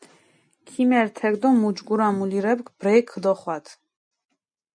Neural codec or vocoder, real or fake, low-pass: none; real; 9.9 kHz